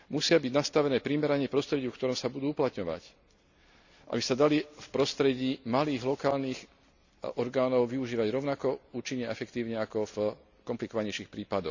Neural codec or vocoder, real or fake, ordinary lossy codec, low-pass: none; real; none; 7.2 kHz